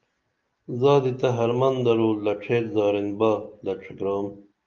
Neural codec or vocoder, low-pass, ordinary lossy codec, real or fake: none; 7.2 kHz; Opus, 24 kbps; real